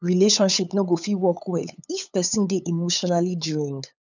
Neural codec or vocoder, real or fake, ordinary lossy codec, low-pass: codec, 16 kHz, 8 kbps, FunCodec, trained on LibriTTS, 25 frames a second; fake; none; 7.2 kHz